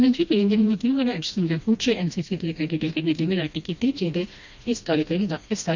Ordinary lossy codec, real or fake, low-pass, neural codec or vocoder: Opus, 64 kbps; fake; 7.2 kHz; codec, 16 kHz, 1 kbps, FreqCodec, smaller model